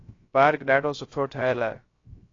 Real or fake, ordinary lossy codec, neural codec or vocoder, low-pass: fake; AAC, 32 kbps; codec, 16 kHz, 0.3 kbps, FocalCodec; 7.2 kHz